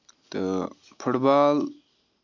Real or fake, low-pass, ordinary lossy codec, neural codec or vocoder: real; 7.2 kHz; MP3, 64 kbps; none